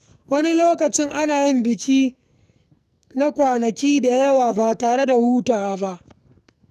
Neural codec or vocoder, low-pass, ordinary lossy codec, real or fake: codec, 44.1 kHz, 2.6 kbps, SNAC; 14.4 kHz; none; fake